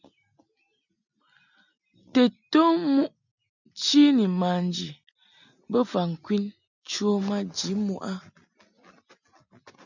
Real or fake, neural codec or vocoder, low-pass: real; none; 7.2 kHz